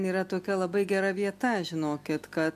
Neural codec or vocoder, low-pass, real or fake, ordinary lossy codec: none; 14.4 kHz; real; MP3, 96 kbps